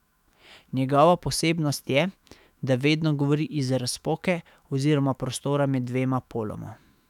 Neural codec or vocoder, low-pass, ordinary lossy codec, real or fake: autoencoder, 48 kHz, 128 numbers a frame, DAC-VAE, trained on Japanese speech; 19.8 kHz; none; fake